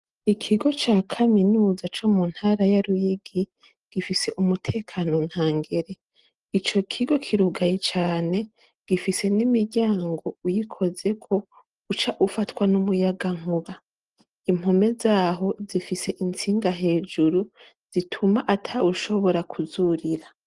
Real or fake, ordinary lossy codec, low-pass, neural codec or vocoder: real; Opus, 24 kbps; 10.8 kHz; none